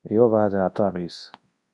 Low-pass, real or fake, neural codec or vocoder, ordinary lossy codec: 10.8 kHz; fake; codec, 24 kHz, 0.9 kbps, WavTokenizer, large speech release; Opus, 64 kbps